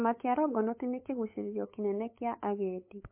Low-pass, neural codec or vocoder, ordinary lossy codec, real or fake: 3.6 kHz; codec, 16 kHz, 8 kbps, FunCodec, trained on LibriTTS, 25 frames a second; MP3, 24 kbps; fake